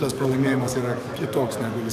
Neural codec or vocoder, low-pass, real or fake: codec, 44.1 kHz, 7.8 kbps, DAC; 14.4 kHz; fake